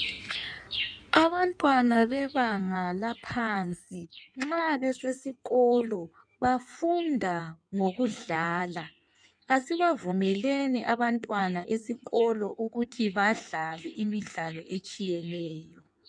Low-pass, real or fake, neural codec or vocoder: 9.9 kHz; fake; codec, 16 kHz in and 24 kHz out, 1.1 kbps, FireRedTTS-2 codec